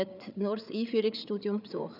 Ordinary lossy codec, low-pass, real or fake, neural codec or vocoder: none; 5.4 kHz; fake; codec, 16 kHz, 8 kbps, FreqCodec, larger model